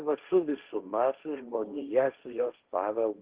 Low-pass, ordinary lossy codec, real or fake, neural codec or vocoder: 3.6 kHz; Opus, 16 kbps; fake; codec, 16 kHz, 1.1 kbps, Voila-Tokenizer